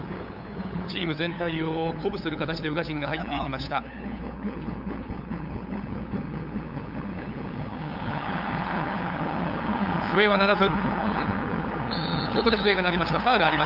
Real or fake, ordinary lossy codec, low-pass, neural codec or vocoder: fake; none; 5.4 kHz; codec, 16 kHz, 8 kbps, FunCodec, trained on LibriTTS, 25 frames a second